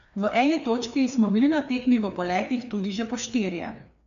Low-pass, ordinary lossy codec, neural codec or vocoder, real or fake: 7.2 kHz; none; codec, 16 kHz, 2 kbps, FreqCodec, larger model; fake